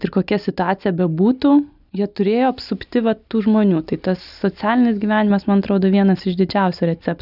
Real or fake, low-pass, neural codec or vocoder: real; 5.4 kHz; none